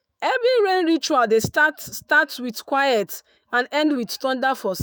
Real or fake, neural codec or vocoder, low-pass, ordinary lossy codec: fake; autoencoder, 48 kHz, 128 numbers a frame, DAC-VAE, trained on Japanese speech; none; none